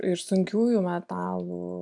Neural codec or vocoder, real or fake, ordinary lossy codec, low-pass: none; real; AAC, 64 kbps; 10.8 kHz